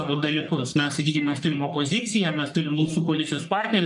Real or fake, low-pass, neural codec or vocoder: fake; 10.8 kHz; codec, 44.1 kHz, 1.7 kbps, Pupu-Codec